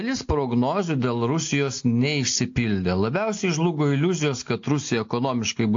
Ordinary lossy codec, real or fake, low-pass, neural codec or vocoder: AAC, 48 kbps; real; 7.2 kHz; none